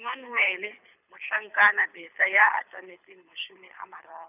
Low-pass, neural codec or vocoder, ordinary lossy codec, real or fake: 3.6 kHz; codec, 24 kHz, 6 kbps, HILCodec; none; fake